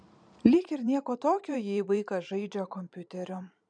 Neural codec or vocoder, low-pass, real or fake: vocoder, 44.1 kHz, 128 mel bands every 512 samples, BigVGAN v2; 9.9 kHz; fake